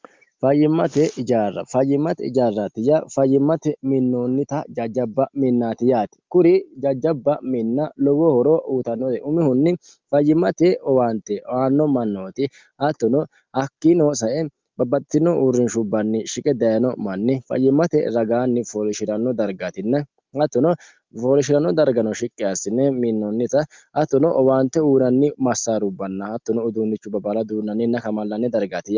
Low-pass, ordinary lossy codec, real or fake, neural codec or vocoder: 7.2 kHz; Opus, 32 kbps; real; none